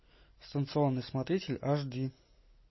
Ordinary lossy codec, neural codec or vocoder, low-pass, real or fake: MP3, 24 kbps; none; 7.2 kHz; real